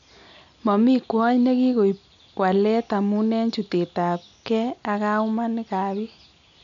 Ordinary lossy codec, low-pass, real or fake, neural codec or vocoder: none; 7.2 kHz; real; none